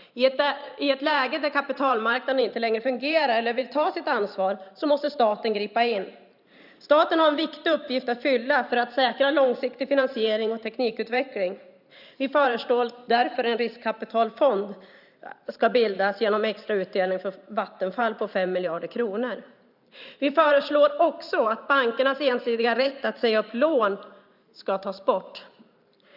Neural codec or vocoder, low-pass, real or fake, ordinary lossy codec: vocoder, 44.1 kHz, 128 mel bands every 512 samples, BigVGAN v2; 5.4 kHz; fake; none